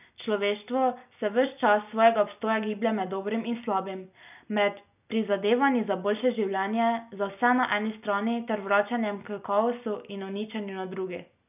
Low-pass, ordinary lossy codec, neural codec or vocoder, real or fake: 3.6 kHz; none; none; real